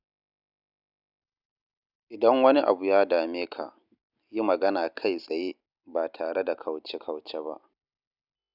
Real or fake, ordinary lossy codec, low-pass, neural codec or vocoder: real; none; 5.4 kHz; none